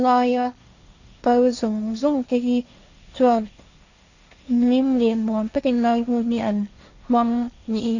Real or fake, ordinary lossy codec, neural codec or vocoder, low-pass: fake; none; codec, 16 kHz, 1.1 kbps, Voila-Tokenizer; 7.2 kHz